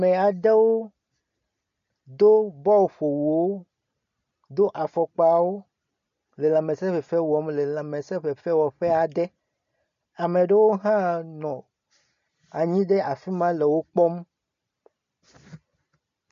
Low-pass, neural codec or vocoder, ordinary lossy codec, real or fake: 7.2 kHz; none; MP3, 48 kbps; real